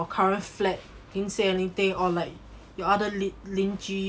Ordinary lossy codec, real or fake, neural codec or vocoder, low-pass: none; real; none; none